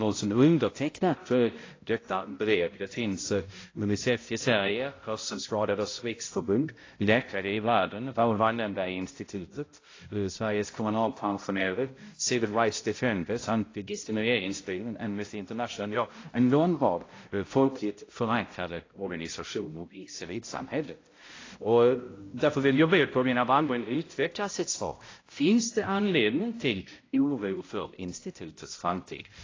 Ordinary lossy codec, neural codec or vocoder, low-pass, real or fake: AAC, 32 kbps; codec, 16 kHz, 0.5 kbps, X-Codec, HuBERT features, trained on balanced general audio; 7.2 kHz; fake